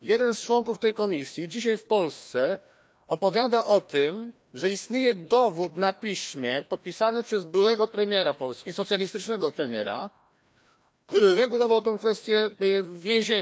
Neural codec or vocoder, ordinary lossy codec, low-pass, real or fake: codec, 16 kHz, 1 kbps, FreqCodec, larger model; none; none; fake